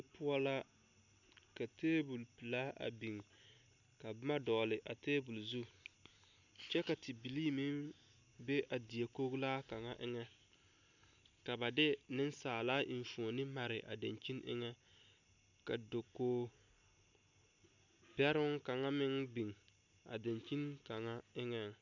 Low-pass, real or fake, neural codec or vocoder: 7.2 kHz; real; none